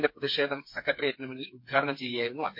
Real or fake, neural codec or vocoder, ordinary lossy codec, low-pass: fake; codec, 16 kHz, 4 kbps, FreqCodec, smaller model; MP3, 32 kbps; 5.4 kHz